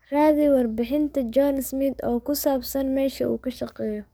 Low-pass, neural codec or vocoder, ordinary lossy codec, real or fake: none; codec, 44.1 kHz, 7.8 kbps, DAC; none; fake